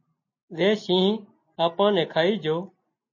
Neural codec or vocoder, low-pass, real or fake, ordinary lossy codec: none; 7.2 kHz; real; MP3, 32 kbps